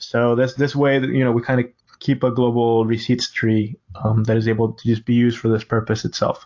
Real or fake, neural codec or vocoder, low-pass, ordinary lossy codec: real; none; 7.2 kHz; AAC, 48 kbps